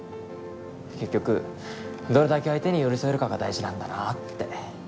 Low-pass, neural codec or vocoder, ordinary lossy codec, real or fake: none; none; none; real